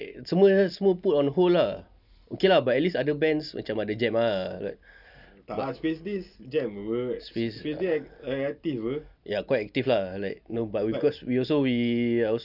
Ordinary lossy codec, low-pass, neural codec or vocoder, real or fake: none; 5.4 kHz; none; real